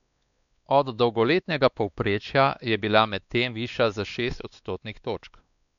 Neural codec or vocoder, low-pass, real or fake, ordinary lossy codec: codec, 16 kHz, 2 kbps, X-Codec, WavLM features, trained on Multilingual LibriSpeech; 7.2 kHz; fake; none